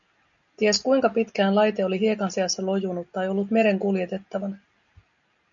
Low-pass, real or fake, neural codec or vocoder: 7.2 kHz; real; none